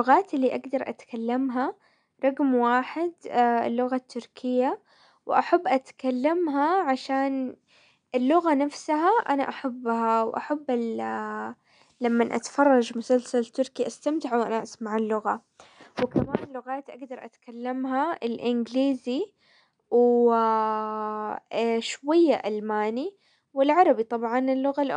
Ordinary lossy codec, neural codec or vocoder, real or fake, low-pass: none; none; real; 9.9 kHz